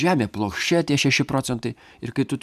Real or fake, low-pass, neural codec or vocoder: fake; 14.4 kHz; vocoder, 44.1 kHz, 128 mel bands every 512 samples, BigVGAN v2